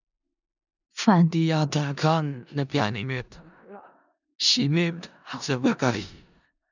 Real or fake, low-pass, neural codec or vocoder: fake; 7.2 kHz; codec, 16 kHz in and 24 kHz out, 0.4 kbps, LongCat-Audio-Codec, four codebook decoder